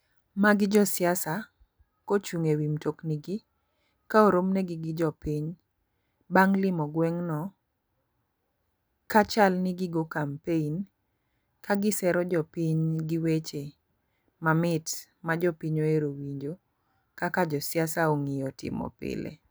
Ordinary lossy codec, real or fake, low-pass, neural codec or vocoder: none; real; none; none